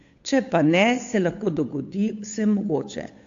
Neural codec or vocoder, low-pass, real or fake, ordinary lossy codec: codec, 16 kHz, 8 kbps, FunCodec, trained on Chinese and English, 25 frames a second; 7.2 kHz; fake; AAC, 48 kbps